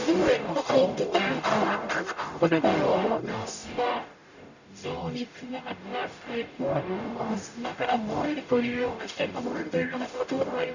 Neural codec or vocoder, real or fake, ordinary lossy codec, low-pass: codec, 44.1 kHz, 0.9 kbps, DAC; fake; none; 7.2 kHz